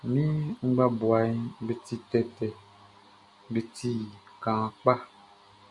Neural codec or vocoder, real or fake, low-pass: none; real; 10.8 kHz